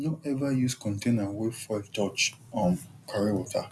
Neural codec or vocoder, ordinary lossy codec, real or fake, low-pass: none; none; real; none